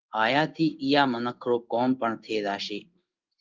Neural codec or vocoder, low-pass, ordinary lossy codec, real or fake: codec, 16 kHz in and 24 kHz out, 1 kbps, XY-Tokenizer; 7.2 kHz; Opus, 32 kbps; fake